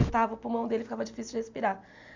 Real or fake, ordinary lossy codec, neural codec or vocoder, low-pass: real; MP3, 64 kbps; none; 7.2 kHz